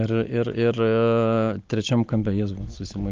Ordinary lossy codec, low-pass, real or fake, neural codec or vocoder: Opus, 24 kbps; 7.2 kHz; real; none